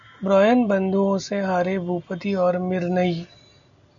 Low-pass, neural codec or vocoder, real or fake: 7.2 kHz; none; real